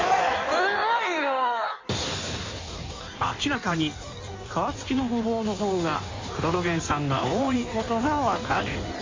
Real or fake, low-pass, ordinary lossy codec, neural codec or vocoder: fake; 7.2 kHz; MP3, 48 kbps; codec, 16 kHz in and 24 kHz out, 1.1 kbps, FireRedTTS-2 codec